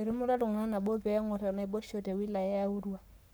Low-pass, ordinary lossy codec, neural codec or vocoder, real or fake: none; none; codec, 44.1 kHz, 7.8 kbps, Pupu-Codec; fake